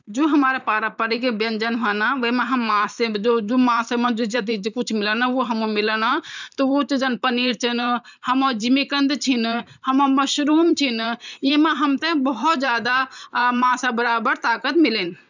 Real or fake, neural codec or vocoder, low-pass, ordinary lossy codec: fake; vocoder, 44.1 kHz, 128 mel bands every 512 samples, BigVGAN v2; 7.2 kHz; none